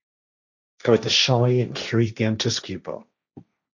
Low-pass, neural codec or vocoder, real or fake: 7.2 kHz; codec, 16 kHz, 1.1 kbps, Voila-Tokenizer; fake